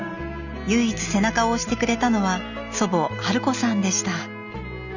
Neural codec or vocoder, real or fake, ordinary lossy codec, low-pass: none; real; none; 7.2 kHz